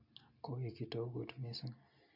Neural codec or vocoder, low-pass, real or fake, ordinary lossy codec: none; 5.4 kHz; real; AAC, 48 kbps